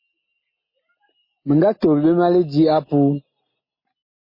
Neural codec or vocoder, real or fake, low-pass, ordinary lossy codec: none; real; 5.4 kHz; MP3, 24 kbps